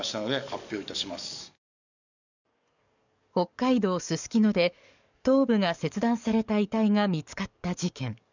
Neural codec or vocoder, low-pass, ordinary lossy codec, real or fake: codec, 44.1 kHz, 7.8 kbps, DAC; 7.2 kHz; none; fake